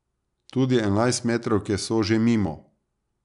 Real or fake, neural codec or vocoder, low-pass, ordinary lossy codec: real; none; 10.8 kHz; none